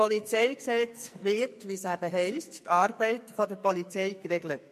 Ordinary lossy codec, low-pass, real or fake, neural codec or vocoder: MP3, 64 kbps; 14.4 kHz; fake; codec, 44.1 kHz, 2.6 kbps, SNAC